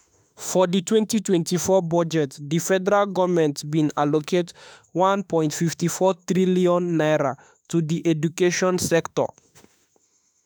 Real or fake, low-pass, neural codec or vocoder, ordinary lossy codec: fake; none; autoencoder, 48 kHz, 32 numbers a frame, DAC-VAE, trained on Japanese speech; none